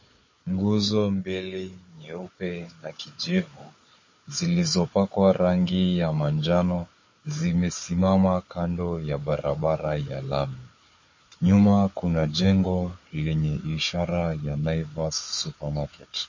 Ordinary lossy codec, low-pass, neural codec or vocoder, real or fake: MP3, 32 kbps; 7.2 kHz; codec, 16 kHz, 4 kbps, FunCodec, trained on Chinese and English, 50 frames a second; fake